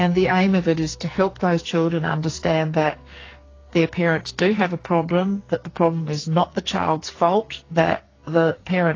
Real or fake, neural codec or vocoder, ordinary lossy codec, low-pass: fake; codec, 44.1 kHz, 2.6 kbps, SNAC; AAC, 32 kbps; 7.2 kHz